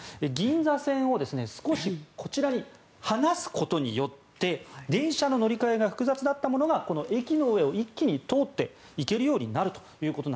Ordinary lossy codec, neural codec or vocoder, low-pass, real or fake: none; none; none; real